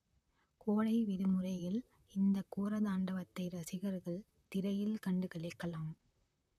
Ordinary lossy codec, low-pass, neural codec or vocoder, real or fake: none; 14.4 kHz; vocoder, 44.1 kHz, 128 mel bands, Pupu-Vocoder; fake